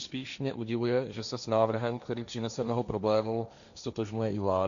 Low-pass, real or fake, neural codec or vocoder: 7.2 kHz; fake; codec, 16 kHz, 1.1 kbps, Voila-Tokenizer